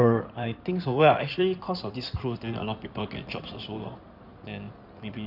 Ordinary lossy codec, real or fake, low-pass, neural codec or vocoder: none; fake; 5.4 kHz; codec, 16 kHz in and 24 kHz out, 2.2 kbps, FireRedTTS-2 codec